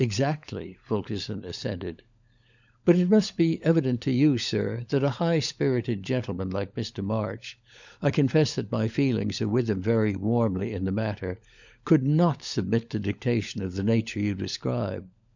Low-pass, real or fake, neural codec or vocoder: 7.2 kHz; fake; codec, 16 kHz, 16 kbps, FunCodec, trained on LibriTTS, 50 frames a second